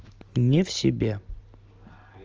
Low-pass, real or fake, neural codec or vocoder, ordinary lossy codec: 7.2 kHz; real; none; Opus, 24 kbps